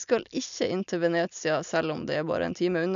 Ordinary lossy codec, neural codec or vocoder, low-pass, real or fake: none; none; 7.2 kHz; real